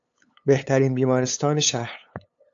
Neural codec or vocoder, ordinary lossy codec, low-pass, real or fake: codec, 16 kHz, 8 kbps, FunCodec, trained on LibriTTS, 25 frames a second; AAC, 64 kbps; 7.2 kHz; fake